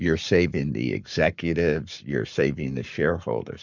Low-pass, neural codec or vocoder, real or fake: 7.2 kHz; codec, 44.1 kHz, 7.8 kbps, Pupu-Codec; fake